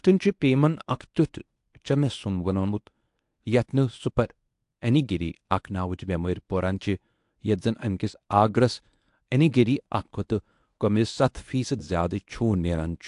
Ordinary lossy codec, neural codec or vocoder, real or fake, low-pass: AAC, 64 kbps; codec, 24 kHz, 0.9 kbps, WavTokenizer, medium speech release version 1; fake; 10.8 kHz